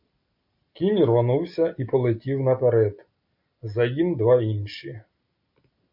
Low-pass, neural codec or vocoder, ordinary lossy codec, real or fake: 5.4 kHz; none; MP3, 48 kbps; real